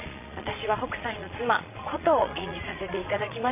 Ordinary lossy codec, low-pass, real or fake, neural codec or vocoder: none; 3.6 kHz; fake; vocoder, 22.05 kHz, 80 mel bands, Vocos